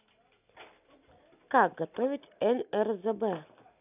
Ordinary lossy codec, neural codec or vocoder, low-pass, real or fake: none; none; 3.6 kHz; real